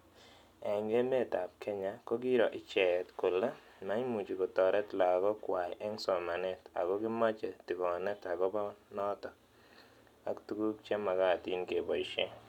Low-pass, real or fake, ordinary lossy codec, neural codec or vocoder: 19.8 kHz; real; none; none